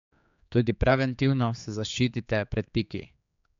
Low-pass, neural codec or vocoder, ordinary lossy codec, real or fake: 7.2 kHz; codec, 16 kHz, 4 kbps, X-Codec, HuBERT features, trained on general audio; MP3, 64 kbps; fake